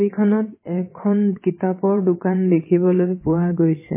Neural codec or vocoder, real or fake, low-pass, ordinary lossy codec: vocoder, 22.05 kHz, 80 mel bands, WaveNeXt; fake; 3.6 kHz; MP3, 16 kbps